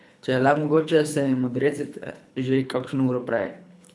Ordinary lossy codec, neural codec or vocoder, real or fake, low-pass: none; codec, 24 kHz, 3 kbps, HILCodec; fake; none